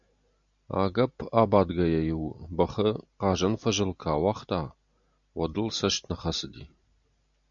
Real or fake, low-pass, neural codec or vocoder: real; 7.2 kHz; none